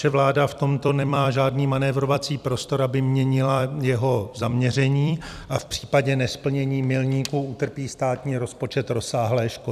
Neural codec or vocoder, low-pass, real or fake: vocoder, 44.1 kHz, 128 mel bands every 256 samples, BigVGAN v2; 14.4 kHz; fake